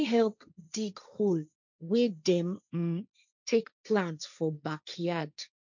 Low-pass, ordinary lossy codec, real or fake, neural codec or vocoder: 7.2 kHz; none; fake; codec, 16 kHz, 1.1 kbps, Voila-Tokenizer